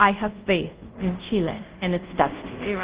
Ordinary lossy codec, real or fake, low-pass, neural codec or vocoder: Opus, 16 kbps; fake; 3.6 kHz; codec, 24 kHz, 0.5 kbps, DualCodec